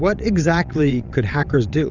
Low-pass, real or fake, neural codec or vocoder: 7.2 kHz; fake; vocoder, 22.05 kHz, 80 mel bands, WaveNeXt